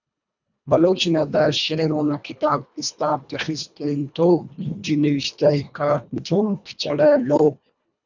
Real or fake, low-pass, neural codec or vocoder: fake; 7.2 kHz; codec, 24 kHz, 1.5 kbps, HILCodec